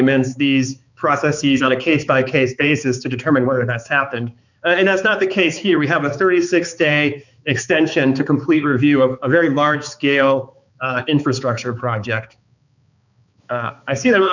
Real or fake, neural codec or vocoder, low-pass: fake; codec, 16 kHz, 4 kbps, X-Codec, HuBERT features, trained on balanced general audio; 7.2 kHz